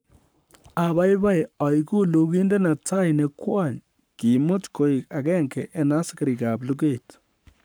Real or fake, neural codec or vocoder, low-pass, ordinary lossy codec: fake; codec, 44.1 kHz, 7.8 kbps, Pupu-Codec; none; none